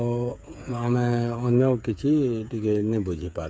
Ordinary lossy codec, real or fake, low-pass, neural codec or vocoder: none; fake; none; codec, 16 kHz, 8 kbps, FreqCodec, smaller model